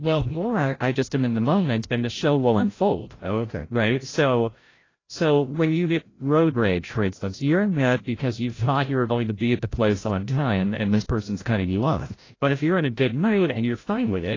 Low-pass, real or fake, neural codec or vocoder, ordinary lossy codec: 7.2 kHz; fake; codec, 16 kHz, 0.5 kbps, FreqCodec, larger model; AAC, 32 kbps